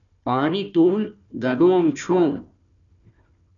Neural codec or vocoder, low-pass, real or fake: codec, 16 kHz, 1 kbps, FunCodec, trained on Chinese and English, 50 frames a second; 7.2 kHz; fake